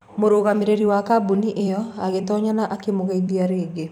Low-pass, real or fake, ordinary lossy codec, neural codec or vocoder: 19.8 kHz; fake; none; vocoder, 44.1 kHz, 128 mel bands every 256 samples, BigVGAN v2